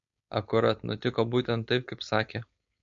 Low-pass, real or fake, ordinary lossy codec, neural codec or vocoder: 7.2 kHz; fake; MP3, 48 kbps; codec, 16 kHz, 4.8 kbps, FACodec